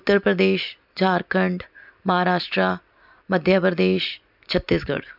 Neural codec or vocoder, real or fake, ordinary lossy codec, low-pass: none; real; none; 5.4 kHz